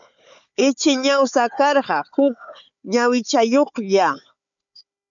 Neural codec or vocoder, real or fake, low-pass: codec, 16 kHz, 4 kbps, FunCodec, trained on Chinese and English, 50 frames a second; fake; 7.2 kHz